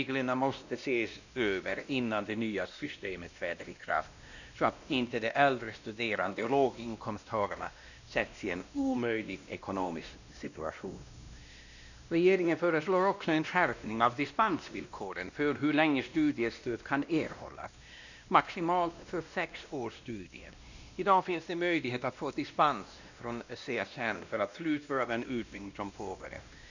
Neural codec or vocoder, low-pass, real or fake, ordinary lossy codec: codec, 16 kHz, 1 kbps, X-Codec, WavLM features, trained on Multilingual LibriSpeech; 7.2 kHz; fake; none